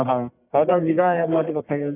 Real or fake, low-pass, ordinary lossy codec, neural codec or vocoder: fake; 3.6 kHz; none; codec, 44.1 kHz, 1.7 kbps, Pupu-Codec